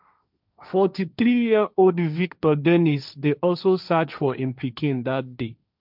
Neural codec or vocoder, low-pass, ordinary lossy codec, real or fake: codec, 16 kHz, 1.1 kbps, Voila-Tokenizer; 5.4 kHz; none; fake